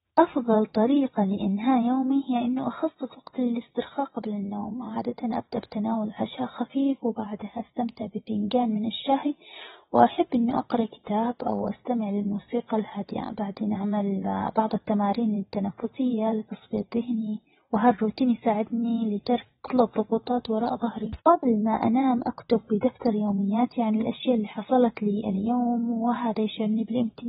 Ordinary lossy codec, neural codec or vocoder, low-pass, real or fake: AAC, 16 kbps; vocoder, 22.05 kHz, 80 mel bands, WaveNeXt; 9.9 kHz; fake